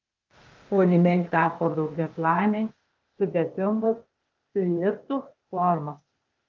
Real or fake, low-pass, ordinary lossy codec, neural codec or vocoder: fake; 7.2 kHz; Opus, 24 kbps; codec, 16 kHz, 0.8 kbps, ZipCodec